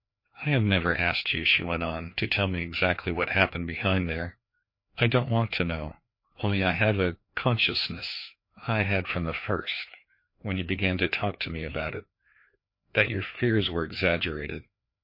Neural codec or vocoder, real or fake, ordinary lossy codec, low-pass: codec, 16 kHz, 2 kbps, FreqCodec, larger model; fake; MP3, 32 kbps; 5.4 kHz